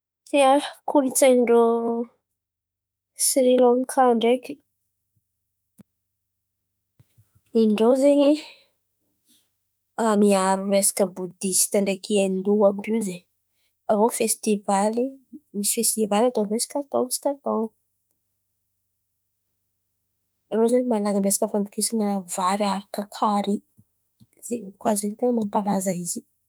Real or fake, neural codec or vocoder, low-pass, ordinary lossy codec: fake; autoencoder, 48 kHz, 32 numbers a frame, DAC-VAE, trained on Japanese speech; none; none